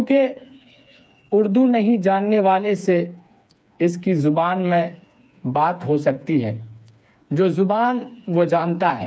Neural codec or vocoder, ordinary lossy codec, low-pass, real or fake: codec, 16 kHz, 4 kbps, FreqCodec, smaller model; none; none; fake